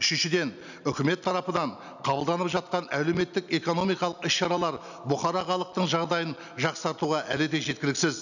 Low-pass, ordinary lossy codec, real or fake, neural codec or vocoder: 7.2 kHz; none; real; none